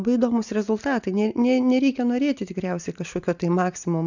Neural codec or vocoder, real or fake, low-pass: none; real; 7.2 kHz